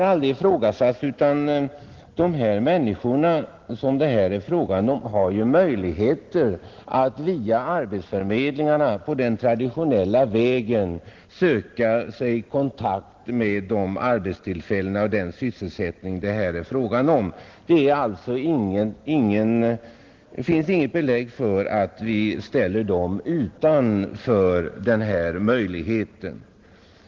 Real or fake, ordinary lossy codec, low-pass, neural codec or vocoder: real; Opus, 16 kbps; 7.2 kHz; none